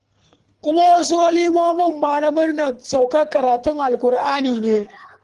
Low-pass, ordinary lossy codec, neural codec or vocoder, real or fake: 10.8 kHz; Opus, 24 kbps; codec, 24 kHz, 3 kbps, HILCodec; fake